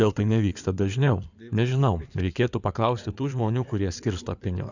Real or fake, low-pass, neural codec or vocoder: fake; 7.2 kHz; codec, 16 kHz in and 24 kHz out, 2.2 kbps, FireRedTTS-2 codec